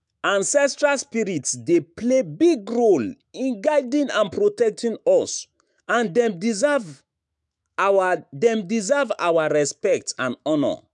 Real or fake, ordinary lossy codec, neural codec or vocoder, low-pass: fake; none; autoencoder, 48 kHz, 128 numbers a frame, DAC-VAE, trained on Japanese speech; 10.8 kHz